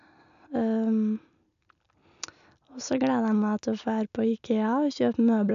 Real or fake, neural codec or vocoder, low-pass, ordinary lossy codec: real; none; 7.2 kHz; none